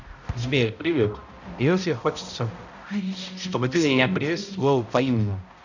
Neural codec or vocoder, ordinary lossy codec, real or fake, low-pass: codec, 16 kHz, 0.5 kbps, X-Codec, HuBERT features, trained on balanced general audio; none; fake; 7.2 kHz